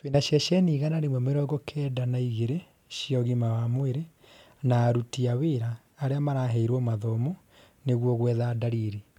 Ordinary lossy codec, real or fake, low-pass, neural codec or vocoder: MP3, 96 kbps; real; 19.8 kHz; none